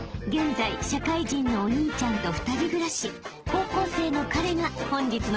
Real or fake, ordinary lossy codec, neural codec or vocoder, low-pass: real; Opus, 16 kbps; none; 7.2 kHz